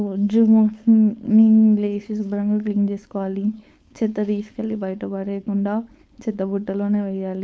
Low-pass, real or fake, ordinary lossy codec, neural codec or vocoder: none; fake; none; codec, 16 kHz, 4.8 kbps, FACodec